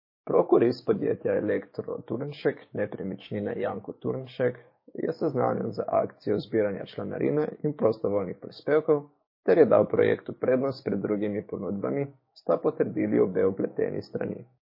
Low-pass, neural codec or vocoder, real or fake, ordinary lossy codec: 5.4 kHz; codec, 16 kHz, 6 kbps, DAC; fake; MP3, 24 kbps